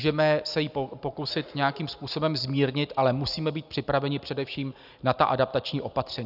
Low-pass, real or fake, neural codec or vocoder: 5.4 kHz; real; none